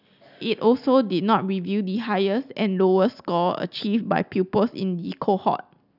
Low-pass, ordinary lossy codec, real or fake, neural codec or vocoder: 5.4 kHz; none; real; none